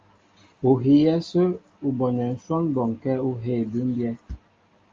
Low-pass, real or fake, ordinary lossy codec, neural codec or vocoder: 7.2 kHz; real; Opus, 32 kbps; none